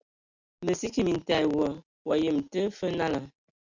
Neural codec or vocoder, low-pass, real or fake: none; 7.2 kHz; real